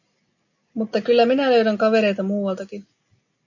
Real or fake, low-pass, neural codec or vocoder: real; 7.2 kHz; none